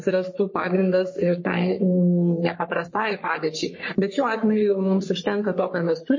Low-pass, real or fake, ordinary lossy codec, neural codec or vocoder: 7.2 kHz; fake; MP3, 32 kbps; codec, 44.1 kHz, 3.4 kbps, Pupu-Codec